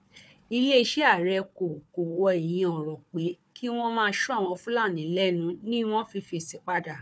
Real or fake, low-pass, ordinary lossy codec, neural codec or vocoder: fake; none; none; codec, 16 kHz, 4 kbps, FunCodec, trained on Chinese and English, 50 frames a second